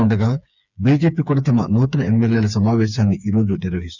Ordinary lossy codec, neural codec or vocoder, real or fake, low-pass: none; codec, 16 kHz, 4 kbps, FreqCodec, smaller model; fake; 7.2 kHz